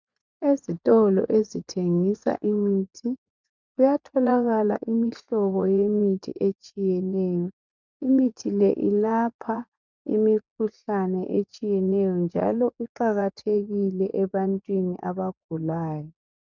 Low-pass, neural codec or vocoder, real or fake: 7.2 kHz; vocoder, 24 kHz, 100 mel bands, Vocos; fake